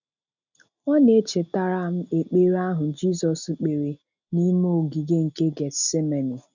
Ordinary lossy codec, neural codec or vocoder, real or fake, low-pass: none; none; real; 7.2 kHz